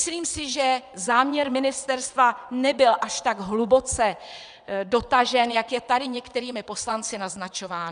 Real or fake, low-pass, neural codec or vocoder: fake; 9.9 kHz; vocoder, 22.05 kHz, 80 mel bands, WaveNeXt